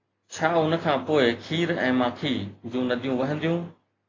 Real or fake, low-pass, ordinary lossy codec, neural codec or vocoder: real; 7.2 kHz; AAC, 32 kbps; none